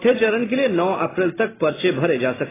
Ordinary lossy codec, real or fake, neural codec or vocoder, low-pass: AAC, 16 kbps; real; none; 3.6 kHz